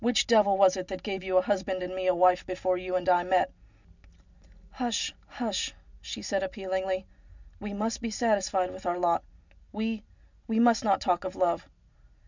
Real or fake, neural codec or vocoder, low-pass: real; none; 7.2 kHz